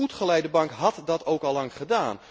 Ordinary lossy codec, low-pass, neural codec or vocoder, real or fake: none; none; none; real